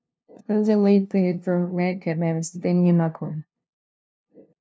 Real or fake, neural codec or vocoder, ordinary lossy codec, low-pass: fake; codec, 16 kHz, 0.5 kbps, FunCodec, trained on LibriTTS, 25 frames a second; none; none